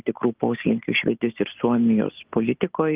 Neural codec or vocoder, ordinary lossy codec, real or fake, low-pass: none; Opus, 24 kbps; real; 3.6 kHz